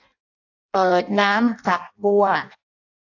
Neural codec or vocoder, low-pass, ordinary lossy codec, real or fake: codec, 16 kHz in and 24 kHz out, 0.6 kbps, FireRedTTS-2 codec; 7.2 kHz; AAC, 48 kbps; fake